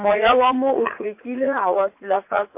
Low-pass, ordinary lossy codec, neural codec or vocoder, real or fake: 3.6 kHz; MP3, 24 kbps; vocoder, 44.1 kHz, 80 mel bands, Vocos; fake